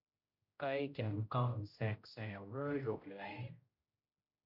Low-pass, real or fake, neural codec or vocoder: 5.4 kHz; fake; codec, 16 kHz, 0.5 kbps, X-Codec, HuBERT features, trained on general audio